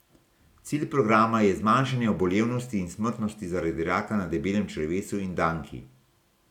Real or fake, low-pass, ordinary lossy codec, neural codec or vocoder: real; 19.8 kHz; none; none